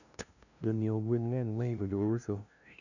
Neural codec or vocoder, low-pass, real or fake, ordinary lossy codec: codec, 16 kHz, 0.5 kbps, FunCodec, trained on LibriTTS, 25 frames a second; 7.2 kHz; fake; none